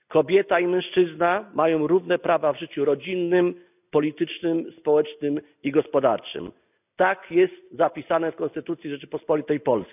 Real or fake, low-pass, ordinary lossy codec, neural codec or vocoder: real; 3.6 kHz; none; none